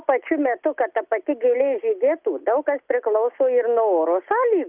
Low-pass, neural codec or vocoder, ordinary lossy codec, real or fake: 3.6 kHz; none; Opus, 24 kbps; real